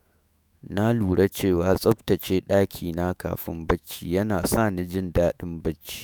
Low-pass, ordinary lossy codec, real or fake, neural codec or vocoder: none; none; fake; autoencoder, 48 kHz, 128 numbers a frame, DAC-VAE, trained on Japanese speech